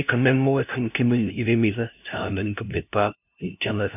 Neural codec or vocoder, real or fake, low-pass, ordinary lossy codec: codec, 16 kHz, 0.5 kbps, FunCodec, trained on LibriTTS, 25 frames a second; fake; 3.6 kHz; none